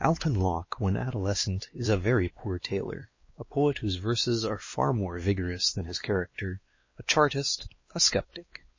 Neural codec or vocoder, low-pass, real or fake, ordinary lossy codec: codec, 16 kHz, 2 kbps, X-Codec, WavLM features, trained on Multilingual LibriSpeech; 7.2 kHz; fake; MP3, 32 kbps